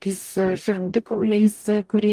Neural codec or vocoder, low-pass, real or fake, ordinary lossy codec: codec, 44.1 kHz, 0.9 kbps, DAC; 14.4 kHz; fake; Opus, 32 kbps